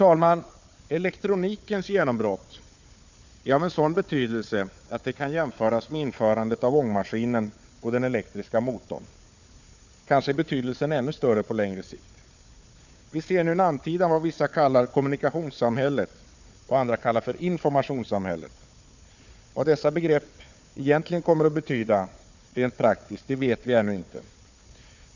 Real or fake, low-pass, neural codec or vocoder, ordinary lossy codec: fake; 7.2 kHz; codec, 16 kHz, 4 kbps, FunCodec, trained on Chinese and English, 50 frames a second; none